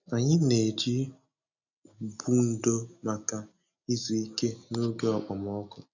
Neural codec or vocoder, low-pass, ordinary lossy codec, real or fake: none; 7.2 kHz; none; real